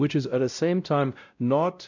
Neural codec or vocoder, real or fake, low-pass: codec, 16 kHz, 0.5 kbps, X-Codec, WavLM features, trained on Multilingual LibriSpeech; fake; 7.2 kHz